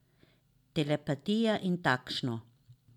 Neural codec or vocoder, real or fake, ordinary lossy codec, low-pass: none; real; none; 19.8 kHz